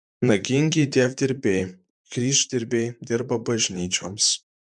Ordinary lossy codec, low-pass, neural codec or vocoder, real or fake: MP3, 96 kbps; 10.8 kHz; vocoder, 44.1 kHz, 128 mel bands, Pupu-Vocoder; fake